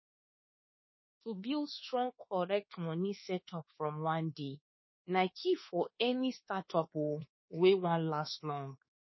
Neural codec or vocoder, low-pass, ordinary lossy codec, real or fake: codec, 24 kHz, 1.2 kbps, DualCodec; 7.2 kHz; MP3, 24 kbps; fake